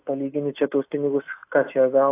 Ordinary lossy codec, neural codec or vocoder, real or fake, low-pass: AAC, 24 kbps; none; real; 3.6 kHz